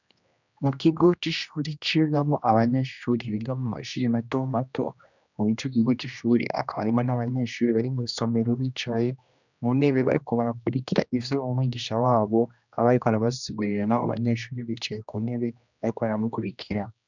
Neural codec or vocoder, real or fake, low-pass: codec, 16 kHz, 1 kbps, X-Codec, HuBERT features, trained on general audio; fake; 7.2 kHz